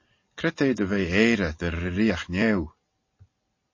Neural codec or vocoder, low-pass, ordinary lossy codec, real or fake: none; 7.2 kHz; MP3, 32 kbps; real